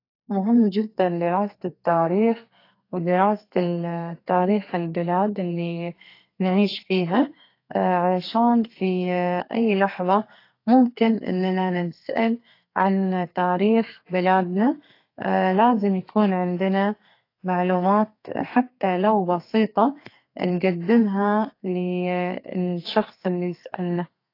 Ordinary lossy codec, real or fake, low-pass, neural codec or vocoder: AAC, 32 kbps; fake; 5.4 kHz; codec, 32 kHz, 1.9 kbps, SNAC